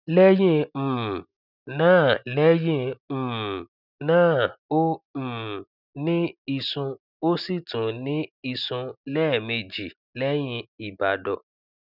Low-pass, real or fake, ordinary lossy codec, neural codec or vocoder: 5.4 kHz; real; none; none